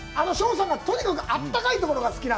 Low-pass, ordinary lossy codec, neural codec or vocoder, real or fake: none; none; none; real